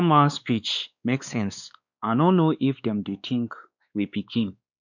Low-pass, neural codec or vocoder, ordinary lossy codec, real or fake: 7.2 kHz; codec, 16 kHz, 2 kbps, X-Codec, WavLM features, trained on Multilingual LibriSpeech; none; fake